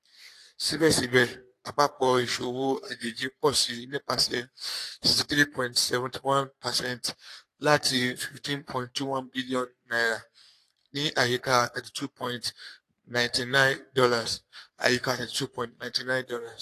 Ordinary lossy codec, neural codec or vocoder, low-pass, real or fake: AAC, 64 kbps; codec, 44.1 kHz, 3.4 kbps, Pupu-Codec; 14.4 kHz; fake